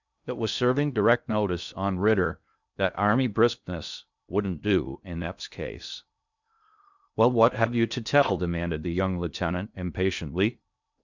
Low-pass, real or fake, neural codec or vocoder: 7.2 kHz; fake; codec, 16 kHz in and 24 kHz out, 0.6 kbps, FocalCodec, streaming, 2048 codes